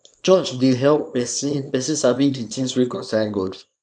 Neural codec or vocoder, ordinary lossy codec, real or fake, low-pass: codec, 24 kHz, 0.9 kbps, WavTokenizer, small release; none; fake; 9.9 kHz